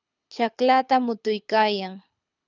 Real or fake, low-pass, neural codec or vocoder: fake; 7.2 kHz; codec, 24 kHz, 6 kbps, HILCodec